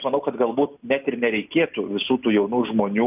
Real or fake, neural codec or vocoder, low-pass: real; none; 3.6 kHz